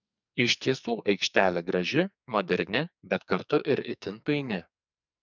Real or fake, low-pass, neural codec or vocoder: fake; 7.2 kHz; codec, 44.1 kHz, 2.6 kbps, SNAC